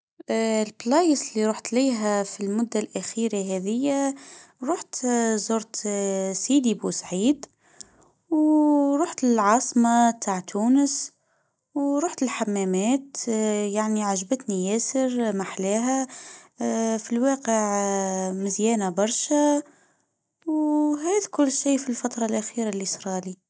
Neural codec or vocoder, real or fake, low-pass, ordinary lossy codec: none; real; none; none